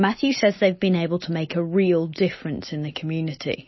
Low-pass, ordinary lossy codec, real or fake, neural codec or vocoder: 7.2 kHz; MP3, 24 kbps; real; none